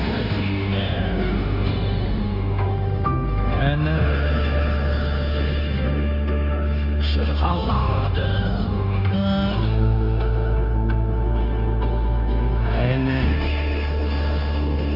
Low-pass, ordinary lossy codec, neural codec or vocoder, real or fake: 5.4 kHz; none; codec, 16 kHz, 0.9 kbps, LongCat-Audio-Codec; fake